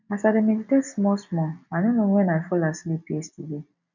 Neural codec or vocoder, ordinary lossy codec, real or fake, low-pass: none; none; real; 7.2 kHz